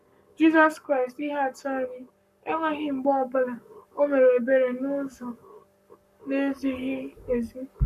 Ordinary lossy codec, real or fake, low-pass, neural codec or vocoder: none; fake; 14.4 kHz; codec, 44.1 kHz, 7.8 kbps, Pupu-Codec